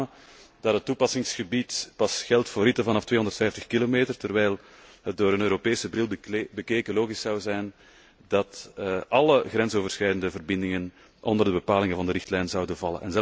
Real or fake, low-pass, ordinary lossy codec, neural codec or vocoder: real; none; none; none